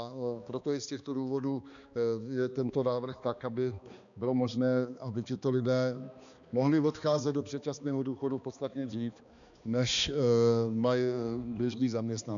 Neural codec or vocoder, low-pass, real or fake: codec, 16 kHz, 2 kbps, X-Codec, HuBERT features, trained on balanced general audio; 7.2 kHz; fake